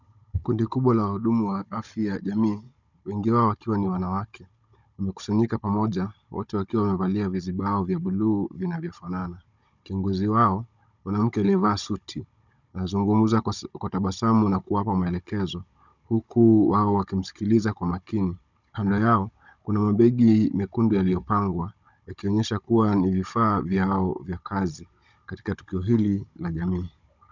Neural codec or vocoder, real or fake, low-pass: codec, 16 kHz, 16 kbps, FunCodec, trained on Chinese and English, 50 frames a second; fake; 7.2 kHz